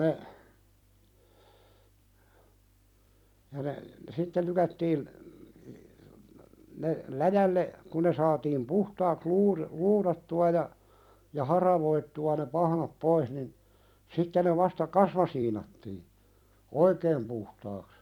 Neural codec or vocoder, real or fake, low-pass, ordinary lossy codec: vocoder, 44.1 kHz, 128 mel bands every 256 samples, BigVGAN v2; fake; 19.8 kHz; none